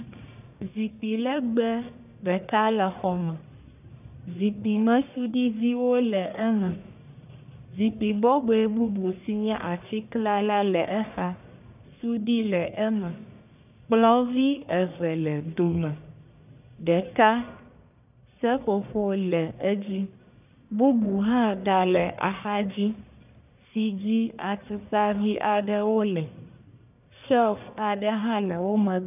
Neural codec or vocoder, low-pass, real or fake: codec, 44.1 kHz, 1.7 kbps, Pupu-Codec; 3.6 kHz; fake